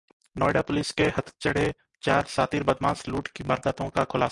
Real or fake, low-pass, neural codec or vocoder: real; 10.8 kHz; none